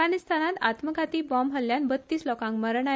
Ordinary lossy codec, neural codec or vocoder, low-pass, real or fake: none; none; none; real